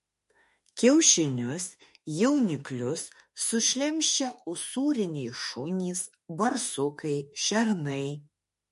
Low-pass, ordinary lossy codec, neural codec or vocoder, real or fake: 14.4 kHz; MP3, 48 kbps; autoencoder, 48 kHz, 32 numbers a frame, DAC-VAE, trained on Japanese speech; fake